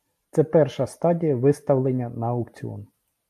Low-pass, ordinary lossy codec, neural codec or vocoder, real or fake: 14.4 kHz; MP3, 64 kbps; none; real